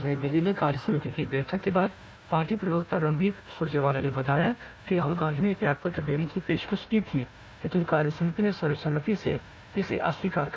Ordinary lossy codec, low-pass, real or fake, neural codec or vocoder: none; none; fake; codec, 16 kHz, 1 kbps, FunCodec, trained on Chinese and English, 50 frames a second